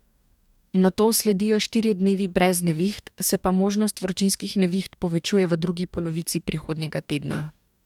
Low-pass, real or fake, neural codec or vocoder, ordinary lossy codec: 19.8 kHz; fake; codec, 44.1 kHz, 2.6 kbps, DAC; none